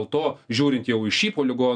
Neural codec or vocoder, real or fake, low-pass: none; real; 9.9 kHz